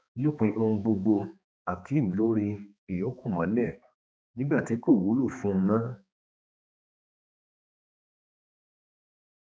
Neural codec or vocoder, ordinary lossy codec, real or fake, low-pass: codec, 16 kHz, 2 kbps, X-Codec, HuBERT features, trained on balanced general audio; none; fake; none